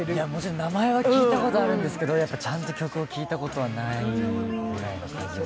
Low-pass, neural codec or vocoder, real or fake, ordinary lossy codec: none; none; real; none